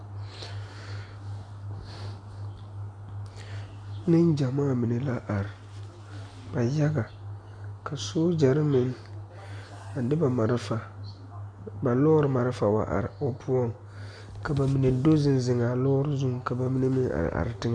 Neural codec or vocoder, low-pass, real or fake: none; 9.9 kHz; real